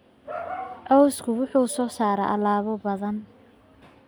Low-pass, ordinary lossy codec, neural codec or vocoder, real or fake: none; none; none; real